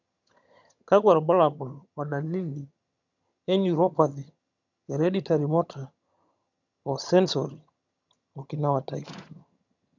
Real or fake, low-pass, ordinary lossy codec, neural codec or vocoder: fake; 7.2 kHz; none; vocoder, 22.05 kHz, 80 mel bands, HiFi-GAN